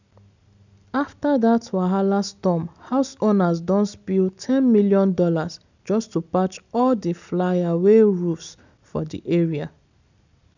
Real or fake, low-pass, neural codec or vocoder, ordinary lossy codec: real; 7.2 kHz; none; none